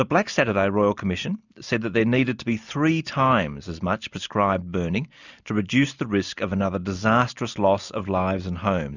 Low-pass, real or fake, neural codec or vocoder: 7.2 kHz; real; none